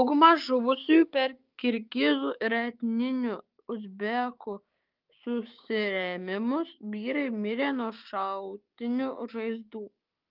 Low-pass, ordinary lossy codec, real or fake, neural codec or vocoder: 5.4 kHz; Opus, 32 kbps; fake; codec, 16 kHz, 6 kbps, DAC